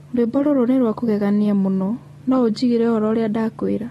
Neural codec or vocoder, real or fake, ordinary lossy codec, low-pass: none; real; AAC, 32 kbps; 14.4 kHz